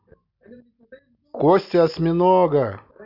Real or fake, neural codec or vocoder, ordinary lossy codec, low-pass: real; none; none; 5.4 kHz